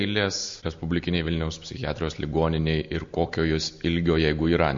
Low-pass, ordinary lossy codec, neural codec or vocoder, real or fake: 7.2 kHz; MP3, 48 kbps; none; real